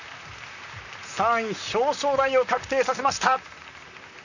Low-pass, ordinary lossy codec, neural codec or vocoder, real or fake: 7.2 kHz; none; vocoder, 44.1 kHz, 128 mel bands, Pupu-Vocoder; fake